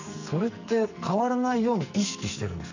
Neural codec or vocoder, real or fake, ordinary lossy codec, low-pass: codec, 44.1 kHz, 2.6 kbps, SNAC; fake; none; 7.2 kHz